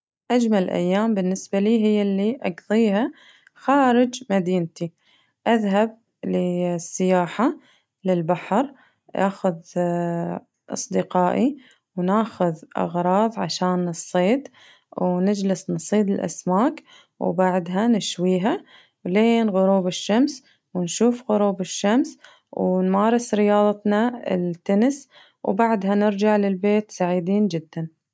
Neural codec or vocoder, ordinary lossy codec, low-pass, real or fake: none; none; none; real